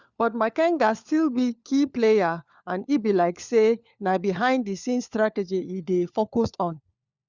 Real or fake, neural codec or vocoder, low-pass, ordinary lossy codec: fake; codec, 16 kHz, 4 kbps, FunCodec, trained on LibriTTS, 50 frames a second; 7.2 kHz; Opus, 64 kbps